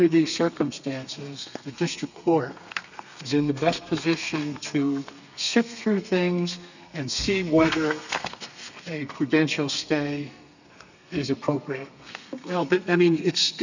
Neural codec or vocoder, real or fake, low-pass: codec, 32 kHz, 1.9 kbps, SNAC; fake; 7.2 kHz